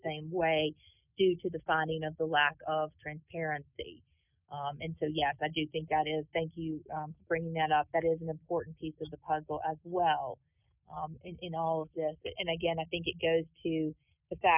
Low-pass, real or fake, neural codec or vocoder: 3.6 kHz; real; none